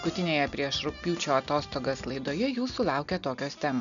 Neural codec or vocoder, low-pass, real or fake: none; 7.2 kHz; real